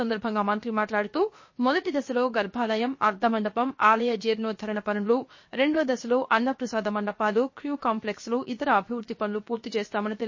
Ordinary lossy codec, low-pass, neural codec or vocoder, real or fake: MP3, 32 kbps; 7.2 kHz; codec, 16 kHz, 0.7 kbps, FocalCodec; fake